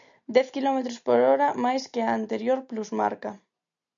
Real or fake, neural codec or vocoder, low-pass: real; none; 7.2 kHz